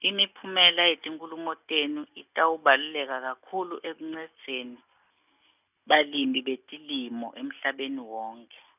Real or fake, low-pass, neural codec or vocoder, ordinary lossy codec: real; 3.6 kHz; none; none